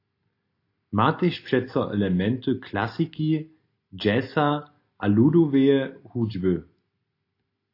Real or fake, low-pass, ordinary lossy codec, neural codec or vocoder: real; 5.4 kHz; AAC, 32 kbps; none